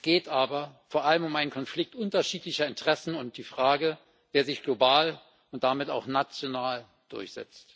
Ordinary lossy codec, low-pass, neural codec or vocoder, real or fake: none; none; none; real